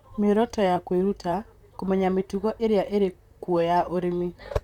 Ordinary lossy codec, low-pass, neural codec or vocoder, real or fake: none; 19.8 kHz; vocoder, 44.1 kHz, 128 mel bands, Pupu-Vocoder; fake